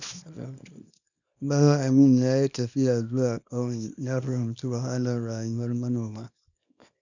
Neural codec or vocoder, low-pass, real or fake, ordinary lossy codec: codec, 24 kHz, 0.9 kbps, WavTokenizer, small release; 7.2 kHz; fake; none